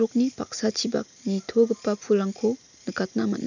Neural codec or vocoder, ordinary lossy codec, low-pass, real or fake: none; none; 7.2 kHz; real